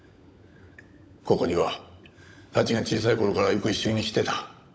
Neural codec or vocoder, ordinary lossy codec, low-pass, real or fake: codec, 16 kHz, 16 kbps, FunCodec, trained on LibriTTS, 50 frames a second; none; none; fake